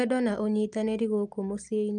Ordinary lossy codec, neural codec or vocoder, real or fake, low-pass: Opus, 32 kbps; none; real; 9.9 kHz